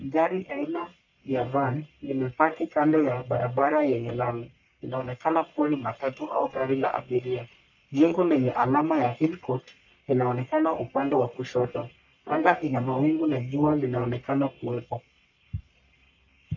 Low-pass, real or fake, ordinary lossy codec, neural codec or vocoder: 7.2 kHz; fake; MP3, 64 kbps; codec, 44.1 kHz, 1.7 kbps, Pupu-Codec